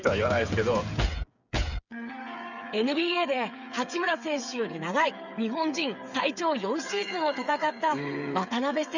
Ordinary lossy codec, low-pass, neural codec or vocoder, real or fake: none; 7.2 kHz; codec, 16 kHz, 8 kbps, FreqCodec, smaller model; fake